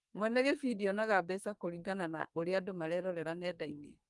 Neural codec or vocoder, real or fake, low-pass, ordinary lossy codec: codec, 44.1 kHz, 2.6 kbps, SNAC; fake; 10.8 kHz; Opus, 32 kbps